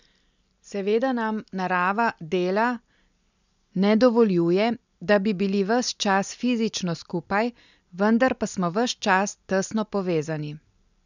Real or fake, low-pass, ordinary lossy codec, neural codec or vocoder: real; 7.2 kHz; none; none